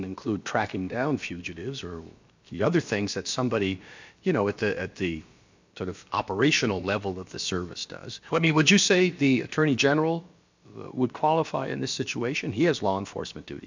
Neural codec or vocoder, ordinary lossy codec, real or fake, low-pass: codec, 16 kHz, about 1 kbps, DyCAST, with the encoder's durations; MP3, 64 kbps; fake; 7.2 kHz